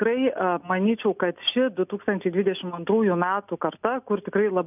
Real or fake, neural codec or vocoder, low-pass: real; none; 3.6 kHz